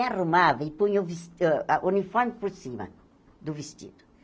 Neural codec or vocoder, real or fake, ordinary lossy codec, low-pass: none; real; none; none